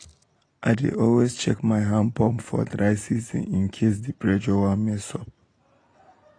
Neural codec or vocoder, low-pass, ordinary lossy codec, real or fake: none; 9.9 kHz; AAC, 32 kbps; real